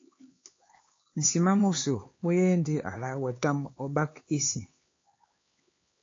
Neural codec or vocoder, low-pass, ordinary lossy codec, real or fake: codec, 16 kHz, 4 kbps, X-Codec, HuBERT features, trained on LibriSpeech; 7.2 kHz; AAC, 32 kbps; fake